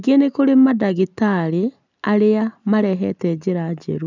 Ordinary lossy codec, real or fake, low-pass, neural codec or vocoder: none; real; 7.2 kHz; none